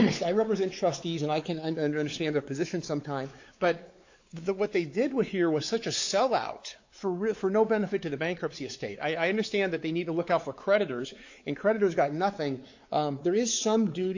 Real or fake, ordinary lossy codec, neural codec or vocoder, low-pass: fake; AAC, 48 kbps; codec, 16 kHz, 4 kbps, X-Codec, WavLM features, trained on Multilingual LibriSpeech; 7.2 kHz